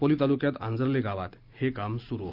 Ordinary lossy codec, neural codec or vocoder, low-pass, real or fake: Opus, 24 kbps; none; 5.4 kHz; real